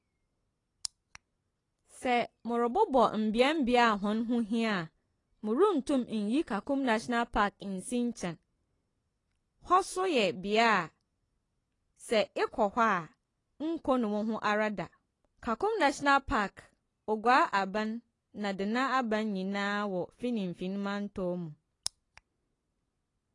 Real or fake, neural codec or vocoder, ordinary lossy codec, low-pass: real; none; AAC, 32 kbps; 10.8 kHz